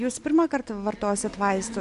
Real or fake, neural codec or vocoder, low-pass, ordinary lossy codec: real; none; 10.8 kHz; MP3, 64 kbps